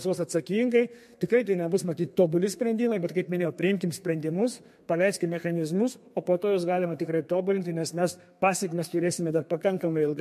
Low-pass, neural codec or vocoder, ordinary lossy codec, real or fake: 14.4 kHz; codec, 32 kHz, 1.9 kbps, SNAC; MP3, 64 kbps; fake